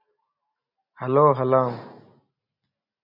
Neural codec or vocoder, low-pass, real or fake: none; 5.4 kHz; real